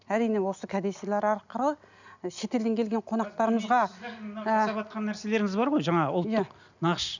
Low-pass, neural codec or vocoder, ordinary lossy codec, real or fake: 7.2 kHz; none; none; real